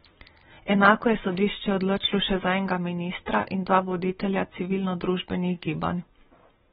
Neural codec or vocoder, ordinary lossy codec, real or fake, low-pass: none; AAC, 16 kbps; real; 19.8 kHz